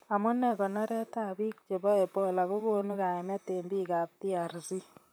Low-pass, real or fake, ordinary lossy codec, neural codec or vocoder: none; fake; none; vocoder, 44.1 kHz, 128 mel bands, Pupu-Vocoder